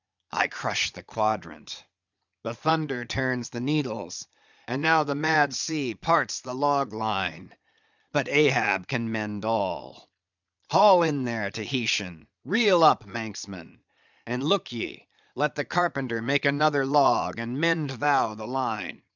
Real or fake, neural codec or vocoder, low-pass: fake; vocoder, 22.05 kHz, 80 mel bands, Vocos; 7.2 kHz